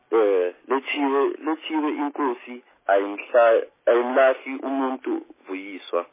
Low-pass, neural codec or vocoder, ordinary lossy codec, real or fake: 3.6 kHz; none; MP3, 16 kbps; real